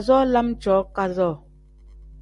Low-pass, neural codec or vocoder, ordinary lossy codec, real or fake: 10.8 kHz; none; Opus, 64 kbps; real